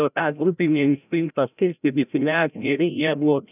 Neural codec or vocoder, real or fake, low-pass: codec, 16 kHz, 0.5 kbps, FreqCodec, larger model; fake; 3.6 kHz